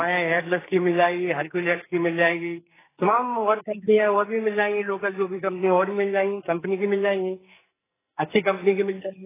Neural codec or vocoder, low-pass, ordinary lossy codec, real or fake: codec, 44.1 kHz, 2.6 kbps, SNAC; 3.6 kHz; AAC, 16 kbps; fake